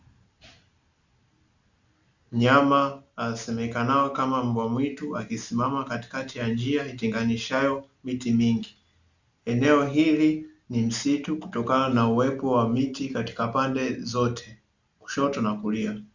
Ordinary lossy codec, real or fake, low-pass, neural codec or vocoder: Opus, 64 kbps; real; 7.2 kHz; none